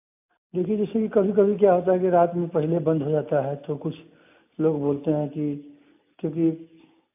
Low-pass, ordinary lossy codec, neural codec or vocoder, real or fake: 3.6 kHz; AAC, 32 kbps; none; real